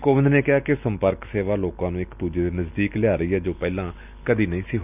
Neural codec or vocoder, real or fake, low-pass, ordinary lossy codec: none; real; 3.6 kHz; none